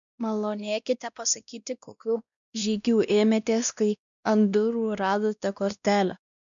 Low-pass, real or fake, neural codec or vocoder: 7.2 kHz; fake; codec, 16 kHz, 1 kbps, X-Codec, WavLM features, trained on Multilingual LibriSpeech